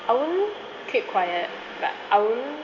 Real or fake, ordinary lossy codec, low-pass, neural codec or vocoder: real; none; 7.2 kHz; none